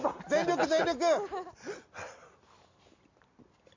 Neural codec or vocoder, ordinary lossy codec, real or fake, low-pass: none; MP3, 64 kbps; real; 7.2 kHz